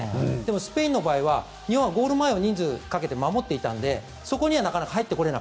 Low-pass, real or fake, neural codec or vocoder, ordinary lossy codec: none; real; none; none